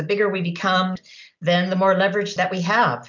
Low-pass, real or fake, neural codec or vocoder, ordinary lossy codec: 7.2 kHz; real; none; MP3, 64 kbps